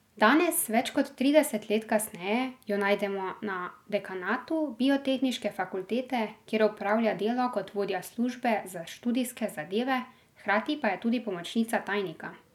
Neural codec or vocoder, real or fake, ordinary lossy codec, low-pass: none; real; none; 19.8 kHz